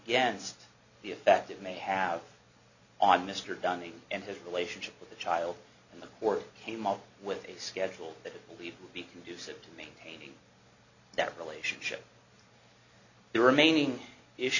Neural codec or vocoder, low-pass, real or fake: none; 7.2 kHz; real